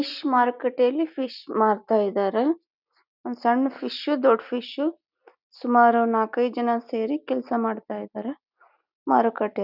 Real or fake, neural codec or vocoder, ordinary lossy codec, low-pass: real; none; none; 5.4 kHz